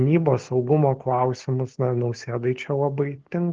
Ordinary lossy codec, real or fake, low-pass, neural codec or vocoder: Opus, 16 kbps; real; 7.2 kHz; none